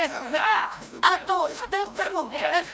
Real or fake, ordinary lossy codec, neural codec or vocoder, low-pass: fake; none; codec, 16 kHz, 0.5 kbps, FreqCodec, larger model; none